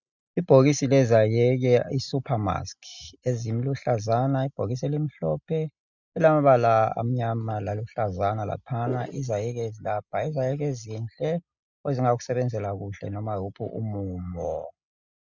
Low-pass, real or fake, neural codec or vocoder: 7.2 kHz; real; none